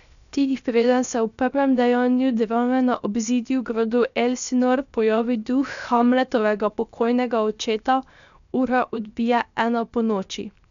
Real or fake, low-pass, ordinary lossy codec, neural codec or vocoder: fake; 7.2 kHz; none; codec, 16 kHz, 0.7 kbps, FocalCodec